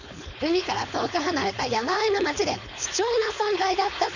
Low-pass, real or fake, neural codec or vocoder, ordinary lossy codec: 7.2 kHz; fake; codec, 16 kHz, 4.8 kbps, FACodec; none